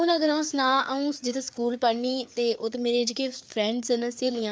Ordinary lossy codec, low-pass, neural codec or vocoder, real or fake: none; none; codec, 16 kHz, 4 kbps, FreqCodec, larger model; fake